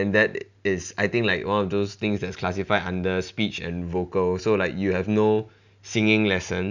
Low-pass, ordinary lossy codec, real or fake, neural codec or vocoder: 7.2 kHz; none; real; none